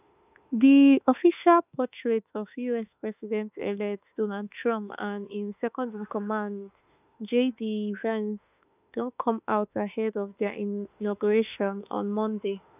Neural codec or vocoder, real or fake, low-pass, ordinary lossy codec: autoencoder, 48 kHz, 32 numbers a frame, DAC-VAE, trained on Japanese speech; fake; 3.6 kHz; none